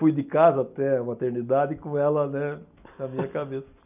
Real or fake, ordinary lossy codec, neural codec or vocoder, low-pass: real; none; none; 3.6 kHz